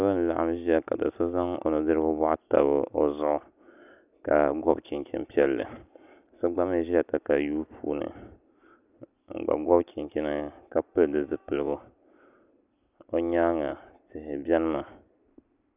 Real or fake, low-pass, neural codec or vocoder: real; 3.6 kHz; none